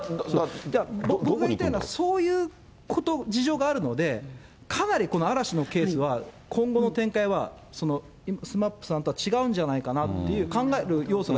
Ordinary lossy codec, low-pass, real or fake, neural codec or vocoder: none; none; real; none